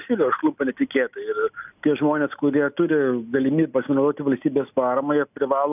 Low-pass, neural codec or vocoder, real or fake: 3.6 kHz; none; real